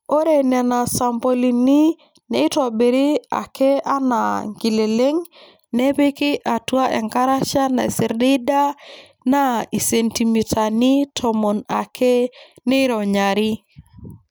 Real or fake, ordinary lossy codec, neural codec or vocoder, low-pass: real; none; none; none